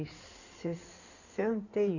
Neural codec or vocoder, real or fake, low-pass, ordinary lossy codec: codec, 16 kHz in and 24 kHz out, 2.2 kbps, FireRedTTS-2 codec; fake; 7.2 kHz; none